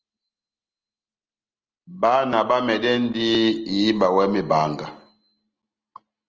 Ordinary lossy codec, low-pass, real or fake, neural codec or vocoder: Opus, 24 kbps; 7.2 kHz; real; none